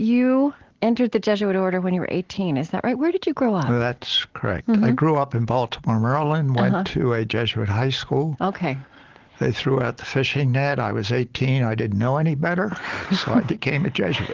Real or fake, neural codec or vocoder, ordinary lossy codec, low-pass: real; none; Opus, 16 kbps; 7.2 kHz